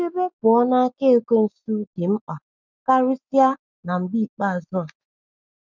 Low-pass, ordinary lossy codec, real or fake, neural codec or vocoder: 7.2 kHz; none; real; none